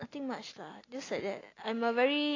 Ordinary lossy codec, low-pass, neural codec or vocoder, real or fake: AAC, 32 kbps; 7.2 kHz; none; real